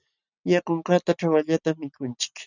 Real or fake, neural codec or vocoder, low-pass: real; none; 7.2 kHz